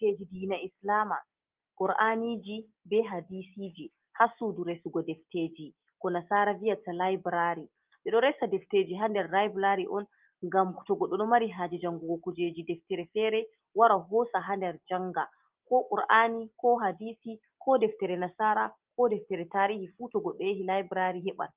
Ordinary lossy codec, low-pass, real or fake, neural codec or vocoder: Opus, 32 kbps; 3.6 kHz; real; none